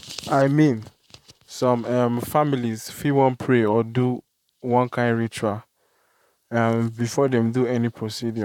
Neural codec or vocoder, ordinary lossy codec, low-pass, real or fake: vocoder, 44.1 kHz, 128 mel bands, Pupu-Vocoder; none; 19.8 kHz; fake